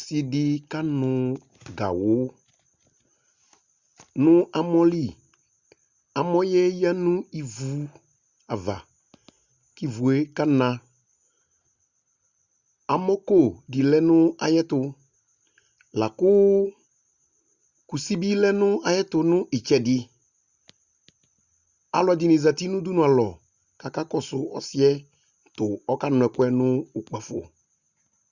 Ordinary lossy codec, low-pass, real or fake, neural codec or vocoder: Opus, 64 kbps; 7.2 kHz; real; none